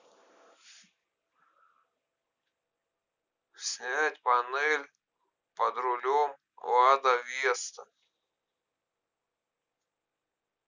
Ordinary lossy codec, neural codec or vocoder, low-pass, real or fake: none; none; 7.2 kHz; real